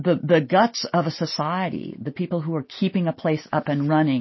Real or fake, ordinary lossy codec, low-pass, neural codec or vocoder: real; MP3, 24 kbps; 7.2 kHz; none